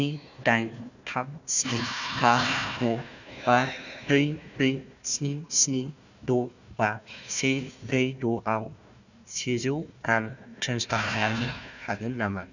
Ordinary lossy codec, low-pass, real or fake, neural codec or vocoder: none; 7.2 kHz; fake; codec, 16 kHz, 1 kbps, FunCodec, trained on Chinese and English, 50 frames a second